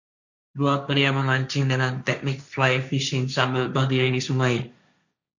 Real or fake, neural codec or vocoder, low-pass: fake; codec, 16 kHz, 1.1 kbps, Voila-Tokenizer; 7.2 kHz